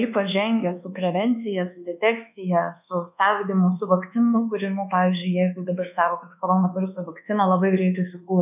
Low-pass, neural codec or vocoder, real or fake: 3.6 kHz; codec, 24 kHz, 1.2 kbps, DualCodec; fake